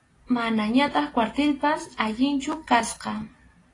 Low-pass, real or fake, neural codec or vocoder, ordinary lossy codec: 10.8 kHz; real; none; AAC, 32 kbps